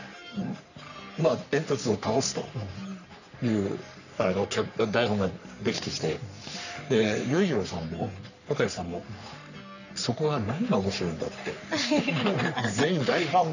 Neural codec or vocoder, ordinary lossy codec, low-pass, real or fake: codec, 44.1 kHz, 3.4 kbps, Pupu-Codec; none; 7.2 kHz; fake